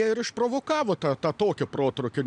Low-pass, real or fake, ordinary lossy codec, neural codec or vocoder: 9.9 kHz; real; MP3, 96 kbps; none